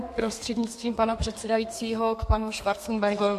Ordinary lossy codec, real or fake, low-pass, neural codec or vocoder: MP3, 64 kbps; fake; 14.4 kHz; codec, 32 kHz, 1.9 kbps, SNAC